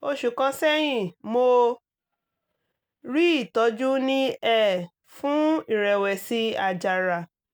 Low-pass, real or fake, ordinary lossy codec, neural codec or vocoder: none; real; none; none